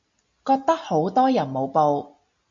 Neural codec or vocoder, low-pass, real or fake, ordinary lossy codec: none; 7.2 kHz; real; MP3, 48 kbps